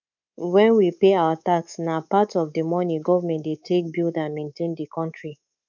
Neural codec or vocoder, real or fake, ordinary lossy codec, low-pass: codec, 24 kHz, 3.1 kbps, DualCodec; fake; none; 7.2 kHz